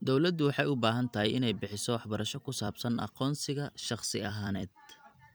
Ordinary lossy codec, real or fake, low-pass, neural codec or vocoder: none; fake; none; vocoder, 44.1 kHz, 128 mel bands every 256 samples, BigVGAN v2